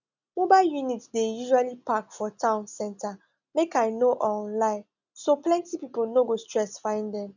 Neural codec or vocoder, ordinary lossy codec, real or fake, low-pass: none; none; real; 7.2 kHz